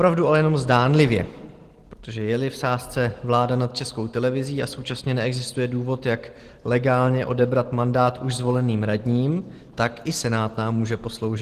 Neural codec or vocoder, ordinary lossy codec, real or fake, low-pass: none; Opus, 16 kbps; real; 14.4 kHz